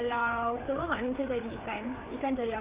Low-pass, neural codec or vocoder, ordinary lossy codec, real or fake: 3.6 kHz; codec, 16 kHz, 4 kbps, FreqCodec, larger model; Opus, 64 kbps; fake